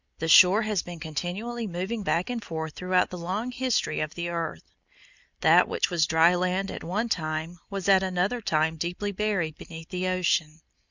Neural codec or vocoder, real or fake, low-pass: none; real; 7.2 kHz